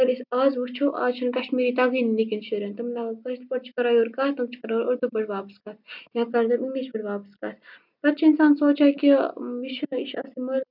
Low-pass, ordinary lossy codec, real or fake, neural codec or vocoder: 5.4 kHz; none; real; none